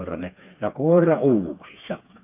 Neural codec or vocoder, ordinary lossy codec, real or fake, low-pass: codec, 16 kHz, 4 kbps, FreqCodec, smaller model; MP3, 32 kbps; fake; 3.6 kHz